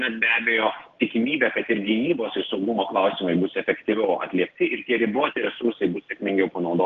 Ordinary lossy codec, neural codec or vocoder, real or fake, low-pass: Opus, 16 kbps; none; real; 7.2 kHz